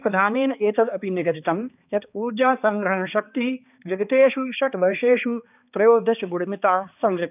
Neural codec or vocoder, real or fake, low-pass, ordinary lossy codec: codec, 16 kHz, 2 kbps, X-Codec, HuBERT features, trained on balanced general audio; fake; 3.6 kHz; none